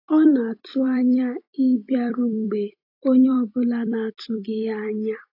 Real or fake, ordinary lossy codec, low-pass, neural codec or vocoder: fake; AAC, 32 kbps; 5.4 kHz; vocoder, 44.1 kHz, 128 mel bands every 256 samples, BigVGAN v2